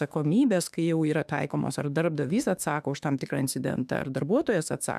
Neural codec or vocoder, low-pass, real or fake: autoencoder, 48 kHz, 32 numbers a frame, DAC-VAE, trained on Japanese speech; 14.4 kHz; fake